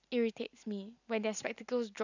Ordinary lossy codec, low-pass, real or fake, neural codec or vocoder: none; 7.2 kHz; real; none